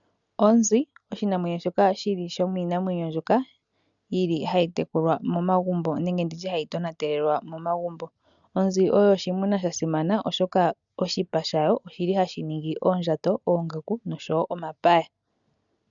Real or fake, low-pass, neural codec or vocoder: real; 7.2 kHz; none